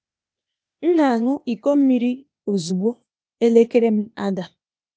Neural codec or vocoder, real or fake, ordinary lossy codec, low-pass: codec, 16 kHz, 0.8 kbps, ZipCodec; fake; none; none